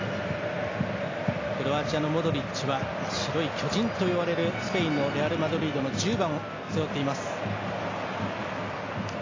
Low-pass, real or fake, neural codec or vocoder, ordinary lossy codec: 7.2 kHz; real; none; AAC, 32 kbps